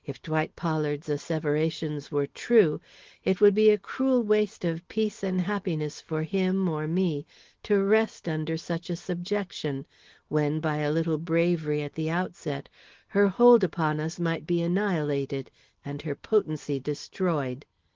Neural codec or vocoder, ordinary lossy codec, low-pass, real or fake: none; Opus, 16 kbps; 7.2 kHz; real